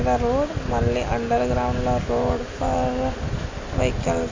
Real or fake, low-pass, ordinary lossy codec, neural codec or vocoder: real; 7.2 kHz; MP3, 48 kbps; none